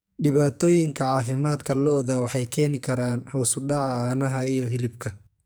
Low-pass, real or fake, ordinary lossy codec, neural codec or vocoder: none; fake; none; codec, 44.1 kHz, 2.6 kbps, SNAC